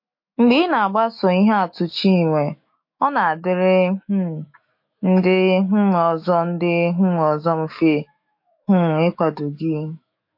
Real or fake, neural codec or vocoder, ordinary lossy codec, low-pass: fake; autoencoder, 48 kHz, 128 numbers a frame, DAC-VAE, trained on Japanese speech; MP3, 32 kbps; 5.4 kHz